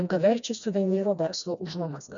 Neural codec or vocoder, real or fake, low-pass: codec, 16 kHz, 1 kbps, FreqCodec, smaller model; fake; 7.2 kHz